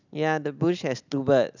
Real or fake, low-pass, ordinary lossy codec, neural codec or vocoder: real; 7.2 kHz; none; none